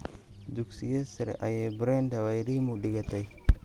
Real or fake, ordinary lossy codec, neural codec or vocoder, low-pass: real; Opus, 24 kbps; none; 19.8 kHz